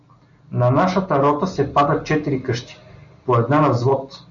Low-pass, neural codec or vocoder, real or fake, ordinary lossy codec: 7.2 kHz; none; real; AAC, 64 kbps